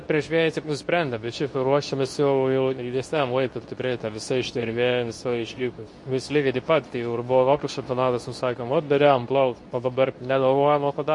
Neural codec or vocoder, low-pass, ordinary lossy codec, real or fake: codec, 24 kHz, 0.9 kbps, WavTokenizer, medium speech release version 2; 10.8 kHz; MP3, 48 kbps; fake